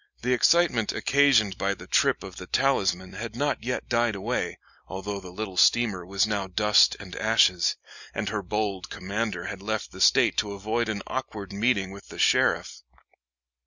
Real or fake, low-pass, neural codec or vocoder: real; 7.2 kHz; none